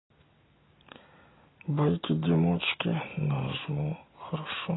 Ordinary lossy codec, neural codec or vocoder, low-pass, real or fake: AAC, 16 kbps; none; 7.2 kHz; real